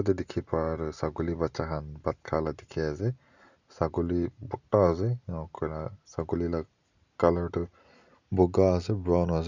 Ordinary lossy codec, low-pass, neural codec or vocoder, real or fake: none; 7.2 kHz; none; real